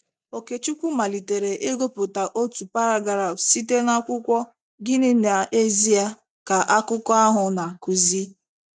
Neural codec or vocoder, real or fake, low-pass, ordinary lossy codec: none; real; none; none